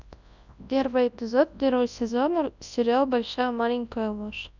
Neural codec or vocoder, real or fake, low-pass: codec, 24 kHz, 0.9 kbps, WavTokenizer, large speech release; fake; 7.2 kHz